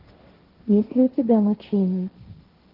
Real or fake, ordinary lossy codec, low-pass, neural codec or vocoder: fake; Opus, 16 kbps; 5.4 kHz; codec, 16 kHz, 1.1 kbps, Voila-Tokenizer